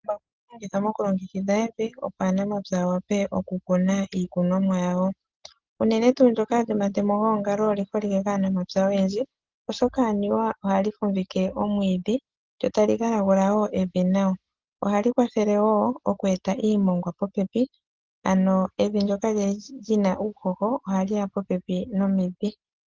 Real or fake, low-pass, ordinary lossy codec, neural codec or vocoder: real; 7.2 kHz; Opus, 16 kbps; none